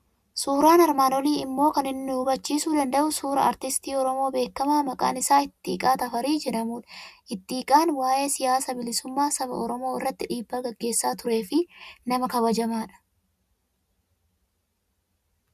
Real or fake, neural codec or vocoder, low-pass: real; none; 14.4 kHz